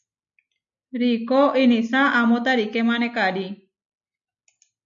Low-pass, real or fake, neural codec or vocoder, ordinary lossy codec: 7.2 kHz; real; none; MP3, 64 kbps